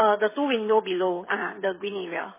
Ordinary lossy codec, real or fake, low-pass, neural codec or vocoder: MP3, 16 kbps; fake; 3.6 kHz; codec, 16 kHz, 8 kbps, FreqCodec, smaller model